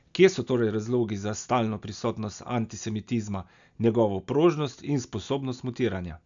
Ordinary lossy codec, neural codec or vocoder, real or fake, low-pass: none; none; real; 7.2 kHz